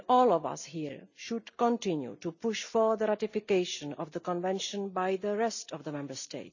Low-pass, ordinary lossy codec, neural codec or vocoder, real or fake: 7.2 kHz; none; none; real